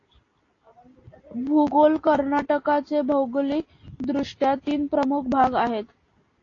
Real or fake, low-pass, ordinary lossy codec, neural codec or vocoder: real; 7.2 kHz; AAC, 32 kbps; none